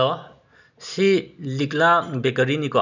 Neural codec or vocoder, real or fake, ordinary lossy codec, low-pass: none; real; none; 7.2 kHz